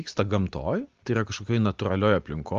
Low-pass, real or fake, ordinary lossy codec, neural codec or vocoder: 7.2 kHz; real; Opus, 24 kbps; none